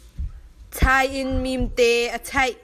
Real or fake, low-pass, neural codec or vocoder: real; 14.4 kHz; none